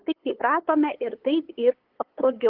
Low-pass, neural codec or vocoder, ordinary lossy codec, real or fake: 5.4 kHz; codec, 16 kHz, 8 kbps, FunCodec, trained on LibriTTS, 25 frames a second; Opus, 16 kbps; fake